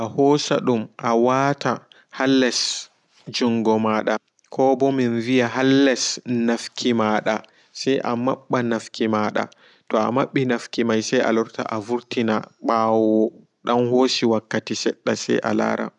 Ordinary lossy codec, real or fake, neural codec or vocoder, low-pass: none; real; none; 10.8 kHz